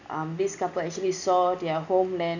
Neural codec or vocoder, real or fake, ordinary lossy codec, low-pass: none; real; none; 7.2 kHz